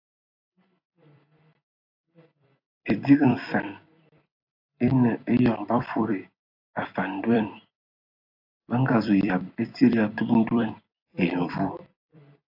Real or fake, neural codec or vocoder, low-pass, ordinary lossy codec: fake; vocoder, 44.1 kHz, 128 mel bands every 256 samples, BigVGAN v2; 5.4 kHz; AAC, 48 kbps